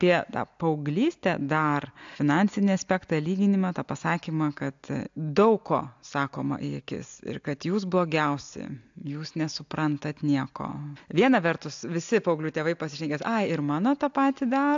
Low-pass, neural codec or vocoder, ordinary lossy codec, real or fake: 7.2 kHz; none; AAC, 64 kbps; real